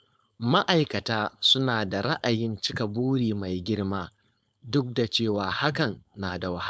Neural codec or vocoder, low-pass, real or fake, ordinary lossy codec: codec, 16 kHz, 4.8 kbps, FACodec; none; fake; none